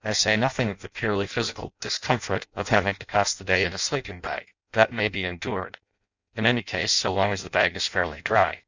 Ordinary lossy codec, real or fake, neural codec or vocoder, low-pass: Opus, 64 kbps; fake; codec, 16 kHz in and 24 kHz out, 0.6 kbps, FireRedTTS-2 codec; 7.2 kHz